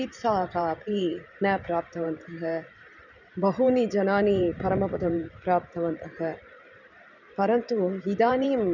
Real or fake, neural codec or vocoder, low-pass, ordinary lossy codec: fake; vocoder, 44.1 kHz, 128 mel bands every 512 samples, BigVGAN v2; 7.2 kHz; none